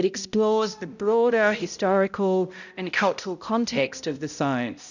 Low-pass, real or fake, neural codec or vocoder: 7.2 kHz; fake; codec, 16 kHz, 0.5 kbps, X-Codec, HuBERT features, trained on balanced general audio